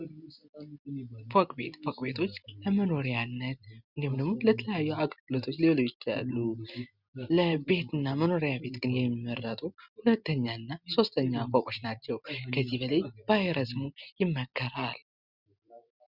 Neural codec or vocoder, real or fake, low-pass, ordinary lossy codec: none; real; 5.4 kHz; Opus, 64 kbps